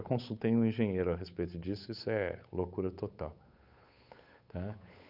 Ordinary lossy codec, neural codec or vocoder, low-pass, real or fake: none; codec, 16 kHz, 8 kbps, FunCodec, trained on Chinese and English, 25 frames a second; 5.4 kHz; fake